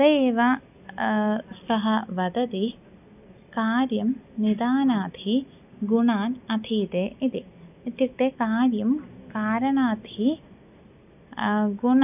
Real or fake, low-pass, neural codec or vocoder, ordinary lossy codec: real; 3.6 kHz; none; none